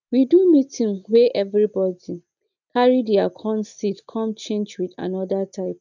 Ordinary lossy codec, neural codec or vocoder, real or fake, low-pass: none; none; real; 7.2 kHz